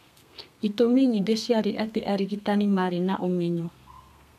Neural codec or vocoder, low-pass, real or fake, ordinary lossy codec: codec, 32 kHz, 1.9 kbps, SNAC; 14.4 kHz; fake; none